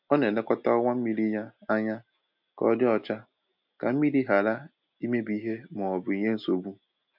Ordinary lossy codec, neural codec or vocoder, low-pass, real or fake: MP3, 48 kbps; none; 5.4 kHz; real